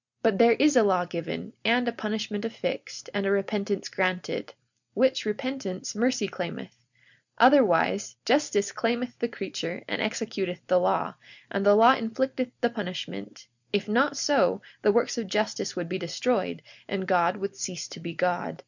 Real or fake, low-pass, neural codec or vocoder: real; 7.2 kHz; none